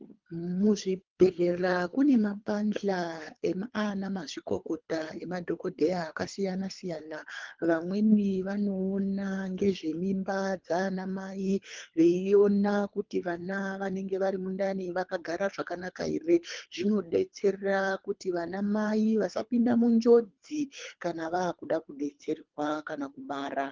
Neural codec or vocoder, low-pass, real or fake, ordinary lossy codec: codec, 24 kHz, 3 kbps, HILCodec; 7.2 kHz; fake; Opus, 32 kbps